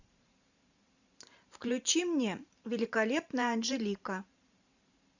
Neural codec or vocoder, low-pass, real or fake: vocoder, 44.1 kHz, 128 mel bands every 512 samples, BigVGAN v2; 7.2 kHz; fake